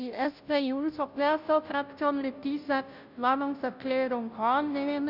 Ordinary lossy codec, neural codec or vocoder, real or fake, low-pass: none; codec, 16 kHz, 0.5 kbps, FunCodec, trained on Chinese and English, 25 frames a second; fake; 5.4 kHz